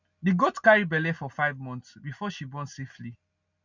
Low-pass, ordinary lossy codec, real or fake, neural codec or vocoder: 7.2 kHz; none; real; none